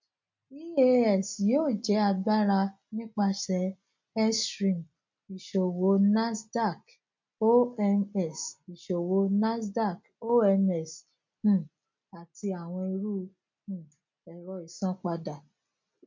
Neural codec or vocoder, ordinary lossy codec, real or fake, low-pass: none; MP3, 48 kbps; real; 7.2 kHz